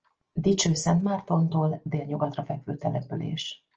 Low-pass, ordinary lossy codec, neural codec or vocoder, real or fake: 7.2 kHz; Opus, 24 kbps; none; real